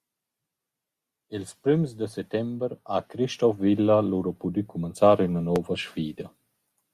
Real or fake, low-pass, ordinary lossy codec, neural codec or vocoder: real; 14.4 kHz; Opus, 64 kbps; none